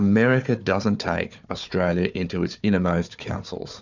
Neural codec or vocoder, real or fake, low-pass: codec, 16 kHz, 4 kbps, FunCodec, trained on Chinese and English, 50 frames a second; fake; 7.2 kHz